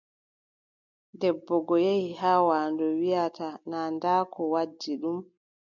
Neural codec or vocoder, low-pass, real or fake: none; 7.2 kHz; real